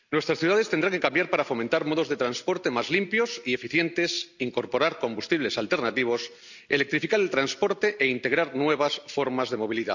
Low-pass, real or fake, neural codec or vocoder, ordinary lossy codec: 7.2 kHz; real; none; none